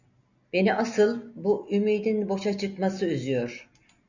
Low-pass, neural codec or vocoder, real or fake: 7.2 kHz; none; real